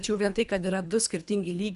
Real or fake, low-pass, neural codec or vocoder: fake; 10.8 kHz; codec, 24 kHz, 3 kbps, HILCodec